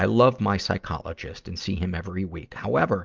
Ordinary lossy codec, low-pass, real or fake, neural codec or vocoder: Opus, 24 kbps; 7.2 kHz; real; none